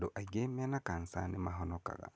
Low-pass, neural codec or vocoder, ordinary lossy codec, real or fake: none; none; none; real